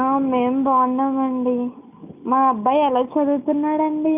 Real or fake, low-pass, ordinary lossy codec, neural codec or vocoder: real; 3.6 kHz; none; none